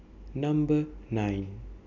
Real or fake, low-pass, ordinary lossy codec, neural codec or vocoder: real; 7.2 kHz; none; none